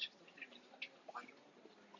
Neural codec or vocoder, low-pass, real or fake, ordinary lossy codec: none; 7.2 kHz; real; AAC, 48 kbps